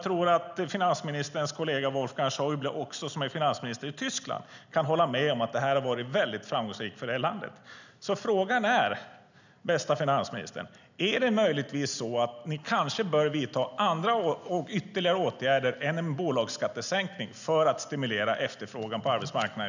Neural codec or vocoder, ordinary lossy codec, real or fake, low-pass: none; none; real; 7.2 kHz